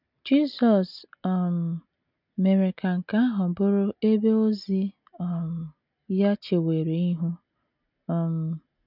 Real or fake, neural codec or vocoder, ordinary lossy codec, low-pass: real; none; none; 5.4 kHz